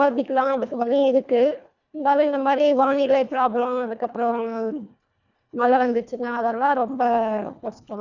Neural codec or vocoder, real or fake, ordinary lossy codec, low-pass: codec, 24 kHz, 1.5 kbps, HILCodec; fake; none; 7.2 kHz